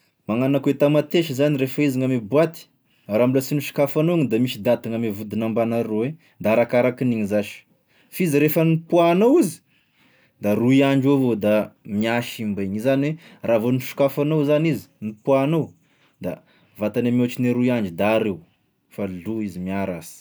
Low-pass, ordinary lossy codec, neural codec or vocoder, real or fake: none; none; none; real